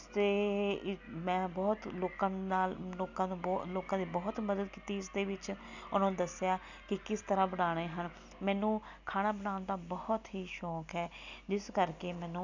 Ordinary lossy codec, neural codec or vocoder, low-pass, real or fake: none; none; 7.2 kHz; real